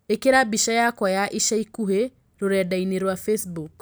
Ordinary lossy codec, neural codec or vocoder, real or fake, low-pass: none; none; real; none